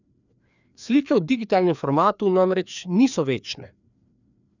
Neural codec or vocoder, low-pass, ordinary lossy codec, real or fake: codec, 16 kHz, 2 kbps, FreqCodec, larger model; 7.2 kHz; none; fake